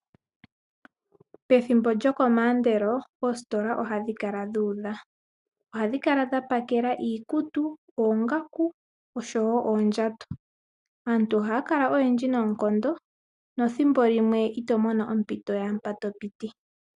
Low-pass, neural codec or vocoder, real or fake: 10.8 kHz; none; real